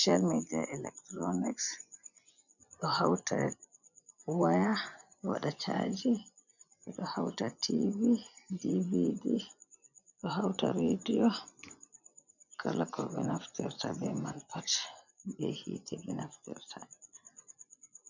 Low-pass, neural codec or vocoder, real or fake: 7.2 kHz; vocoder, 24 kHz, 100 mel bands, Vocos; fake